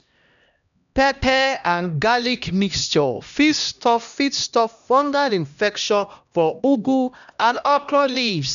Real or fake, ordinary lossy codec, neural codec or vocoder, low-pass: fake; none; codec, 16 kHz, 1 kbps, X-Codec, HuBERT features, trained on LibriSpeech; 7.2 kHz